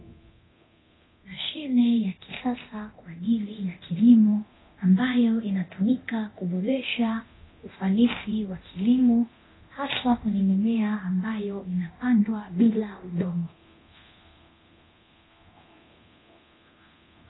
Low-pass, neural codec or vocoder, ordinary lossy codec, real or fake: 7.2 kHz; codec, 24 kHz, 0.9 kbps, DualCodec; AAC, 16 kbps; fake